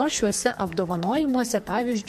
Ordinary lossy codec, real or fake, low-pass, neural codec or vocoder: MP3, 64 kbps; fake; 14.4 kHz; codec, 44.1 kHz, 2.6 kbps, SNAC